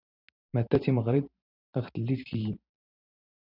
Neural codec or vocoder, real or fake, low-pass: vocoder, 44.1 kHz, 128 mel bands every 512 samples, BigVGAN v2; fake; 5.4 kHz